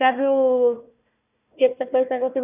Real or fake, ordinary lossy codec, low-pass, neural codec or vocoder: fake; none; 3.6 kHz; codec, 16 kHz, 1 kbps, FunCodec, trained on Chinese and English, 50 frames a second